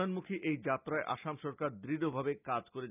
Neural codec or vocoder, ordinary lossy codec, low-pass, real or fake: none; none; 3.6 kHz; real